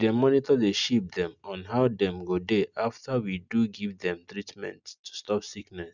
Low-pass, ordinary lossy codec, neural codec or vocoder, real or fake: 7.2 kHz; none; none; real